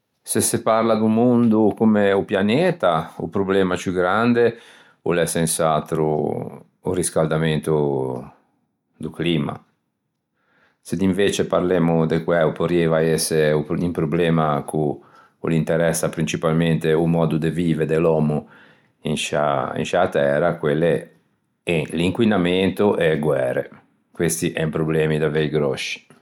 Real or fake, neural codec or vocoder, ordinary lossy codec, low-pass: real; none; none; 19.8 kHz